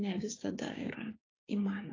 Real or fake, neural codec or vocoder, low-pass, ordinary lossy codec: fake; codec, 16 kHz, 6 kbps, DAC; 7.2 kHz; AAC, 32 kbps